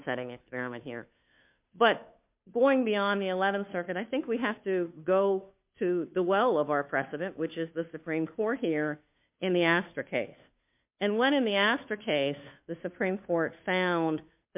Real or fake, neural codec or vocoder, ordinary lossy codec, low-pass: fake; codec, 16 kHz, 2 kbps, FunCodec, trained on Chinese and English, 25 frames a second; MP3, 32 kbps; 3.6 kHz